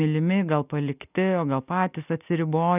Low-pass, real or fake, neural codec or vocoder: 3.6 kHz; real; none